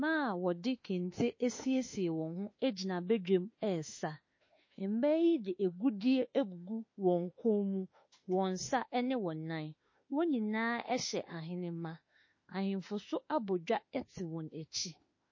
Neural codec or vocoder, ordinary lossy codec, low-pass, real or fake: autoencoder, 48 kHz, 32 numbers a frame, DAC-VAE, trained on Japanese speech; MP3, 32 kbps; 7.2 kHz; fake